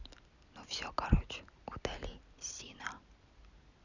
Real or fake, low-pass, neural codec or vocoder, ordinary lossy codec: real; 7.2 kHz; none; none